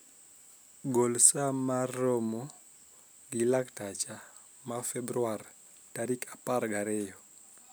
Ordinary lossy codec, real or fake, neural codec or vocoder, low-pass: none; real; none; none